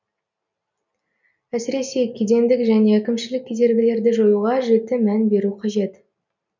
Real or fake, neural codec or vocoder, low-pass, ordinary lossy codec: real; none; 7.2 kHz; none